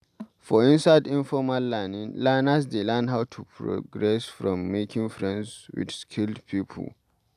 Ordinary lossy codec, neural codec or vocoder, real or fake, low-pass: none; none; real; 14.4 kHz